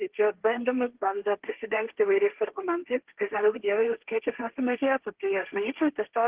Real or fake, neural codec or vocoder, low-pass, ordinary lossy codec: fake; codec, 16 kHz, 1.1 kbps, Voila-Tokenizer; 3.6 kHz; Opus, 16 kbps